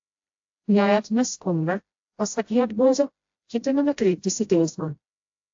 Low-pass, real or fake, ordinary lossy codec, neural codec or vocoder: 7.2 kHz; fake; AAC, 48 kbps; codec, 16 kHz, 0.5 kbps, FreqCodec, smaller model